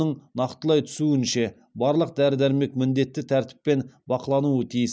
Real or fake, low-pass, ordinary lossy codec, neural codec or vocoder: real; none; none; none